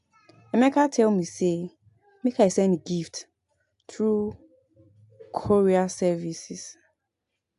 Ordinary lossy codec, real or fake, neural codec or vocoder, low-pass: AAC, 64 kbps; real; none; 10.8 kHz